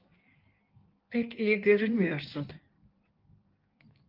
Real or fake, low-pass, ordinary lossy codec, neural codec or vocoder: fake; 5.4 kHz; Opus, 32 kbps; codec, 16 kHz in and 24 kHz out, 1.1 kbps, FireRedTTS-2 codec